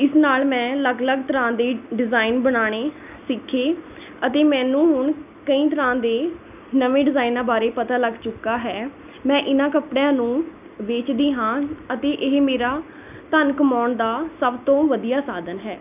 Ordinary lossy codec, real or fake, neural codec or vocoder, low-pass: none; real; none; 3.6 kHz